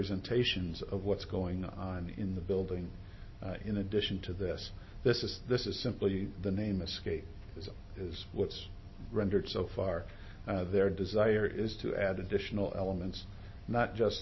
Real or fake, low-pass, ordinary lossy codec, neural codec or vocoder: real; 7.2 kHz; MP3, 24 kbps; none